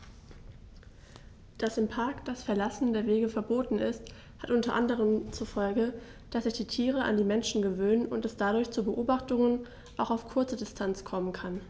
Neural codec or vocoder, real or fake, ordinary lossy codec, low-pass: none; real; none; none